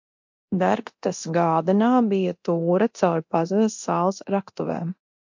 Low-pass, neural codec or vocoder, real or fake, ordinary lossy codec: 7.2 kHz; codec, 24 kHz, 0.9 kbps, DualCodec; fake; MP3, 48 kbps